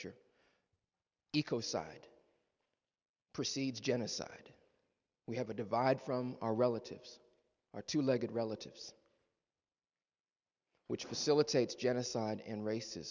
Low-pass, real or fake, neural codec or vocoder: 7.2 kHz; real; none